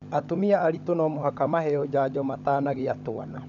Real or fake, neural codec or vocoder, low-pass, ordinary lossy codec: fake; codec, 16 kHz, 16 kbps, FunCodec, trained on LibriTTS, 50 frames a second; 7.2 kHz; none